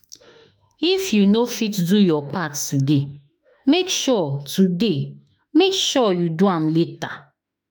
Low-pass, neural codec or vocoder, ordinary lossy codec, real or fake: none; autoencoder, 48 kHz, 32 numbers a frame, DAC-VAE, trained on Japanese speech; none; fake